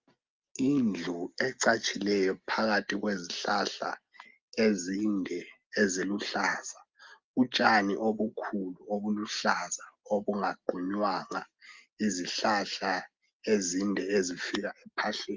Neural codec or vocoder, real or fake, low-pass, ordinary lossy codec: none; real; 7.2 kHz; Opus, 32 kbps